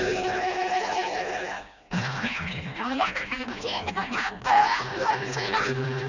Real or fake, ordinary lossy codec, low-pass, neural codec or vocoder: fake; none; 7.2 kHz; codec, 16 kHz, 1 kbps, FreqCodec, smaller model